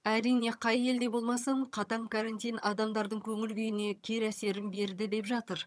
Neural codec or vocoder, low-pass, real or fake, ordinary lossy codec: vocoder, 22.05 kHz, 80 mel bands, HiFi-GAN; none; fake; none